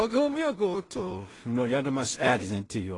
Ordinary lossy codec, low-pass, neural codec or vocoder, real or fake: AAC, 32 kbps; 10.8 kHz; codec, 16 kHz in and 24 kHz out, 0.4 kbps, LongCat-Audio-Codec, two codebook decoder; fake